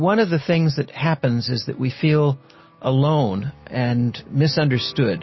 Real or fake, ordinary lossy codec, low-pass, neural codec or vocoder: real; MP3, 24 kbps; 7.2 kHz; none